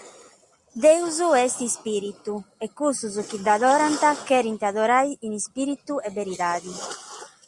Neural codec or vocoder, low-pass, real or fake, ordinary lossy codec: none; 10.8 kHz; real; Opus, 64 kbps